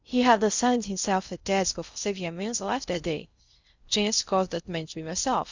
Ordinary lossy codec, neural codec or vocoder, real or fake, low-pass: Opus, 64 kbps; codec, 16 kHz in and 24 kHz out, 0.6 kbps, FocalCodec, streaming, 4096 codes; fake; 7.2 kHz